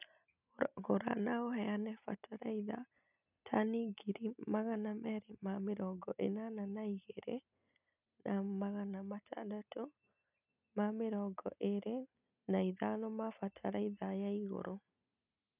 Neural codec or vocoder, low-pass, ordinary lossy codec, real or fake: none; 3.6 kHz; none; real